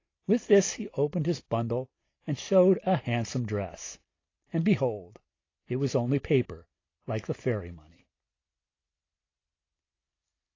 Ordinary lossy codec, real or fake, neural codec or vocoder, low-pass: AAC, 32 kbps; real; none; 7.2 kHz